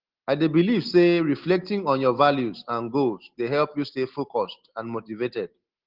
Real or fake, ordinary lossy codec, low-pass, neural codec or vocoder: real; Opus, 16 kbps; 5.4 kHz; none